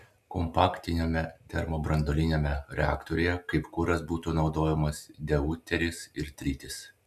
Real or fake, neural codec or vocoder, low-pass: real; none; 14.4 kHz